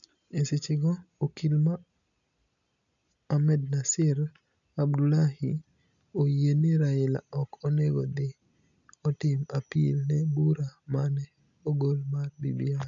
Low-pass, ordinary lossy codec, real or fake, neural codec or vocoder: 7.2 kHz; none; real; none